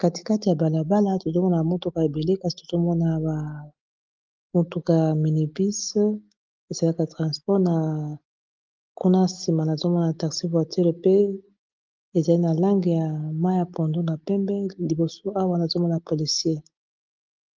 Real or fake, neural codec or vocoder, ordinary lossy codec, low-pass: real; none; Opus, 32 kbps; 7.2 kHz